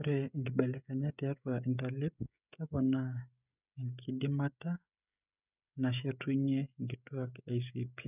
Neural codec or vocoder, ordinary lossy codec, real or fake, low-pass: codec, 16 kHz, 16 kbps, FreqCodec, smaller model; none; fake; 3.6 kHz